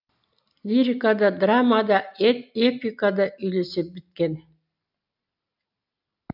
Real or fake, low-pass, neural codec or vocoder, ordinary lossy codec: real; 5.4 kHz; none; none